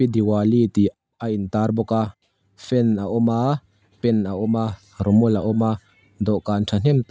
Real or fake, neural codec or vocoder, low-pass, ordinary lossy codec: real; none; none; none